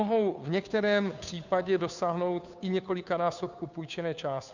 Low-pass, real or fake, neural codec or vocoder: 7.2 kHz; fake; codec, 16 kHz, 2 kbps, FunCodec, trained on Chinese and English, 25 frames a second